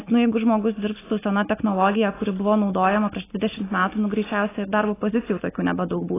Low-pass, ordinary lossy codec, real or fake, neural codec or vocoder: 3.6 kHz; AAC, 16 kbps; real; none